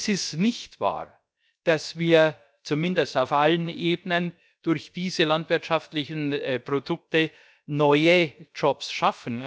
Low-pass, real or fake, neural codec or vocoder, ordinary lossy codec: none; fake; codec, 16 kHz, about 1 kbps, DyCAST, with the encoder's durations; none